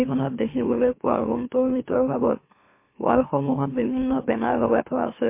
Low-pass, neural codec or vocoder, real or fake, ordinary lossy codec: 3.6 kHz; autoencoder, 44.1 kHz, a latent of 192 numbers a frame, MeloTTS; fake; MP3, 24 kbps